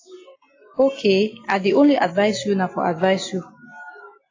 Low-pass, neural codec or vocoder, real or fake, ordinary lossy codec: 7.2 kHz; none; real; AAC, 32 kbps